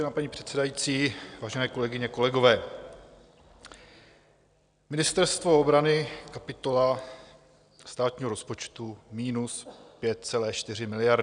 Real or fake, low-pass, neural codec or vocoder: real; 9.9 kHz; none